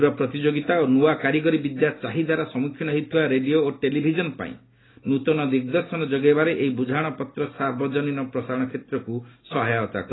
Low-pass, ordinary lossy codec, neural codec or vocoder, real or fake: 7.2 kHz; AAC, 16 kbps; none; real